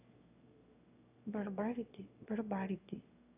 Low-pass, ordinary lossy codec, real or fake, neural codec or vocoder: 3.6 kHz; none; fake; autoencoder, 22.05 kHz, a latent of 192 numbers a frame, VITS, trained on one speaker